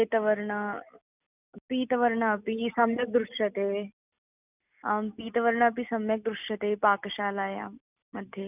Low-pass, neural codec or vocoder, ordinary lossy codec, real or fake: 3.6 kHz; none; none; real